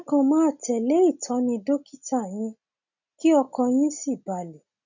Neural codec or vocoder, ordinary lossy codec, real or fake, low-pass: none; none; real; 7.2 kHz